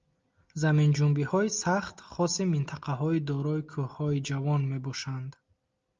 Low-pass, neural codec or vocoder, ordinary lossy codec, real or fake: 7.2 kHz; none; Opus, 32 kbps; real